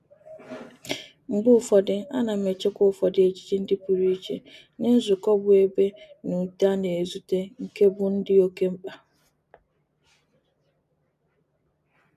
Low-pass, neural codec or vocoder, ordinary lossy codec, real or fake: 14.4 kHz; none; none; real